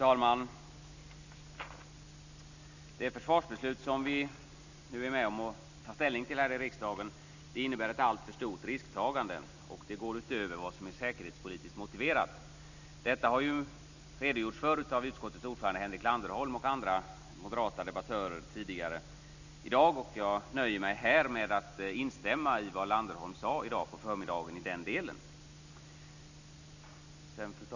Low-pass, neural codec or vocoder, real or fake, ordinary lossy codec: 7.2 kHz; none; real; none